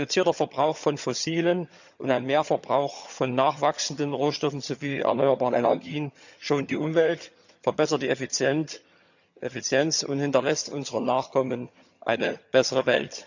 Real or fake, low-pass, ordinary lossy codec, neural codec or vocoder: fake; 7.2 kHz; none; vocoder, 22.05 kHz, 80 mel bands, HiFi-GAN